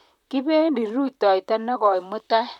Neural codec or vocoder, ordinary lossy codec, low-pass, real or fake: vocoder, 44.1 kHz, 128 mel bands, Pupu-Vocoder; none; 19.8 kHz; fake